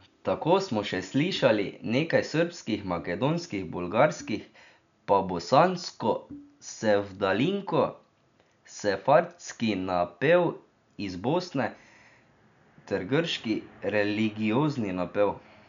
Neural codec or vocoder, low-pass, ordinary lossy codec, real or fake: none; 7.2 kHz; none; real